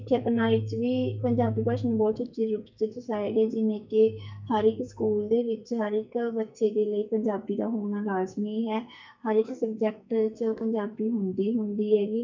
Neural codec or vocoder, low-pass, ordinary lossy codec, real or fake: codec, 44.1 kHz, 2.6 kbps, SNAC; 7.2 kHz; MP3, 64 kbps; fake